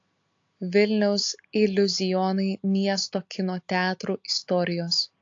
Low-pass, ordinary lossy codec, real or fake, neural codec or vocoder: 7.2 kHz; AAC, 48 kbps; real; none